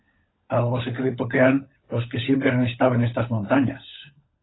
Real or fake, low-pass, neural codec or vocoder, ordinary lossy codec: fake; 7.2 kHz; codec, 16 kHz, 16 kbps, FunCodec, trained on LibriTTS, 50 frames a second; AAC, 16 kbps